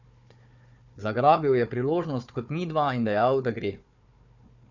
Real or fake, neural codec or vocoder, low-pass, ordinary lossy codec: fake; codec, 16 kHz, 4 kbps, FunCodec, trained on Chinese and English, 50 frames a second; 7.2 kHz; none